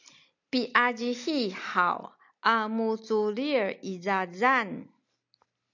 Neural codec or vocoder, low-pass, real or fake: none; 7.2 kHz; real